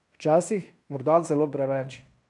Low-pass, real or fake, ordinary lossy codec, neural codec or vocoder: 10.8 kHz; fake; none; codec, 16 kHz in and 24 kHz out, 0.9 kbps, LongCat-Audio-Codec, fine tuned four codebook decoder